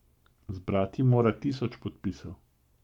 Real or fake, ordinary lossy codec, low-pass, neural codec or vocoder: fake; MP3, 96 kbps; 19.8 kHz; codec, 44.1 kHz, 7.8 kbps, Pupu-Codec